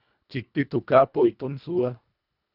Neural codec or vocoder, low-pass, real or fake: codec, 24 kHz, 1.5 kbps, HILCodec; 5.4 kHz; fake